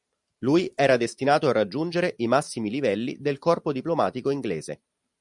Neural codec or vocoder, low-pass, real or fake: vocoder, 44.1 kHz, 128 mel bands every 512 samples, BigVGAN v2; 10.8 kHz; fake